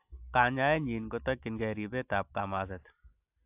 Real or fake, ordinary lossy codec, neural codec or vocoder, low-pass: real; AAC, 32 kbps; none; 3.6 kHz